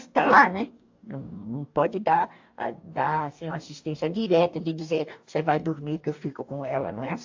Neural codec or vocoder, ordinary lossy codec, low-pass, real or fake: codec, 44.1 kHz, 2.6 kbps, DAC; AAC, 48 kbps; 7.2 kHz; fake